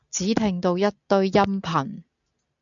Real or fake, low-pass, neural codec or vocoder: real; 7.2 kHz; none